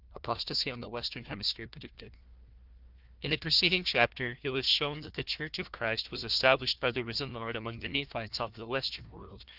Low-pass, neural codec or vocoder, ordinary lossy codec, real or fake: 5.4 kHz; codec, 16 kHz, 1 kbps, FunCodec, trained on Chinese and English, 50 frames a second; Opus, 32 kbps; fake